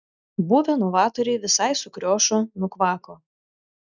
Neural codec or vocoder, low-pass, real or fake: none; 7.2 kHz; real